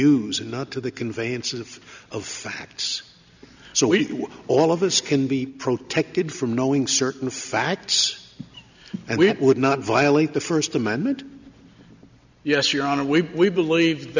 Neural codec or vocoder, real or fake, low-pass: none; real; 7.2 kHz